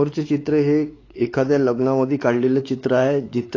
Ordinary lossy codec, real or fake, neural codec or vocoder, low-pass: AAC, 32 kbps; fake; codec, 16 kHz, 4 kbps, X-Codec, WavLM features, trained on Multilingual LibriSpeech; 7.2 kHz